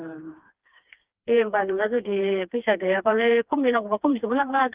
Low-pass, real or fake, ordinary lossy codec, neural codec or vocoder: 3.6 kHz; fake; Opus, 32 kbps; codec, 16 kHz, 2 kbps, FreqCodec, smaller model